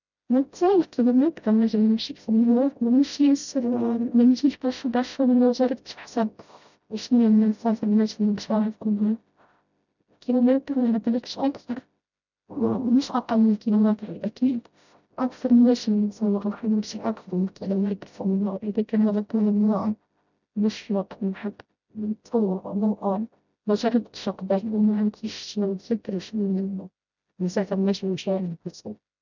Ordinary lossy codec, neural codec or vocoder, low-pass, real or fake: none; codec, 16 kHz, 0.5 kbps, FreqCodec, smaller model; 7.2 kHz; fake